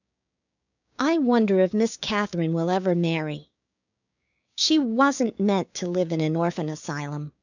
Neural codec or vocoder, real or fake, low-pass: codec, 16 kHz, 6 kbps, DAC; fake; 7.2 kHz